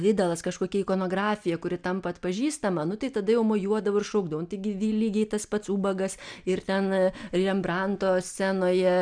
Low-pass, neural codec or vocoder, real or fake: 9.9 kHz; none; real